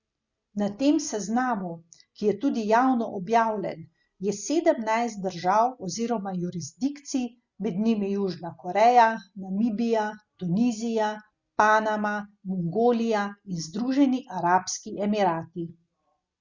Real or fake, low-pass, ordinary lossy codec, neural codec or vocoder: real; 7.2 kHz; Opus, 64 kbps; none